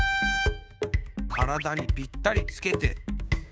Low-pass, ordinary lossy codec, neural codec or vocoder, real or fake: none; none; codec, 16 kHz, 6 kbps, DAC; fake